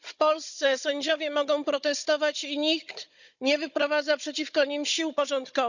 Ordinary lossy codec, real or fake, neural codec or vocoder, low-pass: none; fake; codec, 16 kHz, 16 kbps, FunCodec, trained on Chinese and English, 50 frames a second; 7.2 kHz